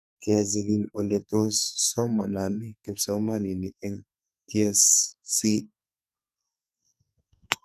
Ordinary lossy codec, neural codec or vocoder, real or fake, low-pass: none; codec, 44.1 kHz, 2.6 kbps, SNAC; fake; 14.4 kHz